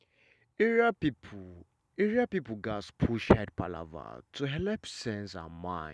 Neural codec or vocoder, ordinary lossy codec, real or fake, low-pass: none; none; real; none